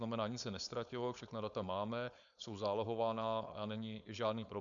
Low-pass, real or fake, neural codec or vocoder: 7.2 kHz; fake; codec, 16 kHz, 4.8 kbps, FACodec